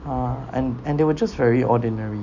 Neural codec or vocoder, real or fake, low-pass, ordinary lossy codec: none; real; 7.2 kHz; none